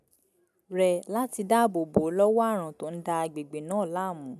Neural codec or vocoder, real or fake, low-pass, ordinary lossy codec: none; real; 14.4 kHz; none